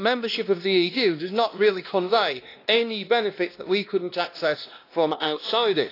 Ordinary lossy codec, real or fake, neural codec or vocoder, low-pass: AAC, 32 kbps; fake; codec, 16 kHz, 1 kbps, X-Codec, WavLM features, trained on Multilingual LibriSpeech; 5.4 kHz